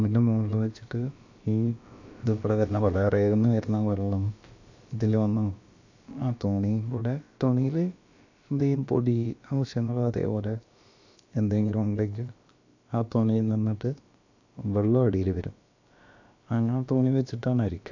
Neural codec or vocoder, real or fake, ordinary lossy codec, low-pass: codec, 16 kHz, about 1 kbps, DyCAST, with the encoder's durations; fake; AAC, 48 kbps; 7.2 kHz